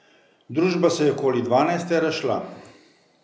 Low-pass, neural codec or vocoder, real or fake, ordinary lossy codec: none; none; real; none